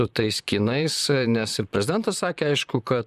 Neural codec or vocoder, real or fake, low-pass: vocoder, 44.1 kHz, 128 mel bands, Pupu-Vocoder; fake; 14.4 kHz